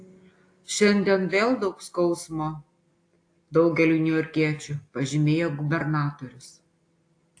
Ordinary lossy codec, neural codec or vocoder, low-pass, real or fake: AAC, 48 kbps; none; 9.9 kHz; real